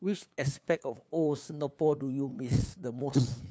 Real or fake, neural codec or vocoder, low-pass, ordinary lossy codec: fake; codec, 16 kHz, 2 kbps, FunCodec, trained on LibriTTS, 25 frames a second; none; none